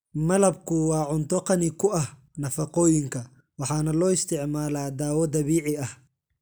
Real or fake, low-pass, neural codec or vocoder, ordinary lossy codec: real; none; none; none